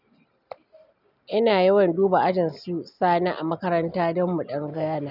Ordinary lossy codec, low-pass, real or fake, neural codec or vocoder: none; 5.4 kHz; real; none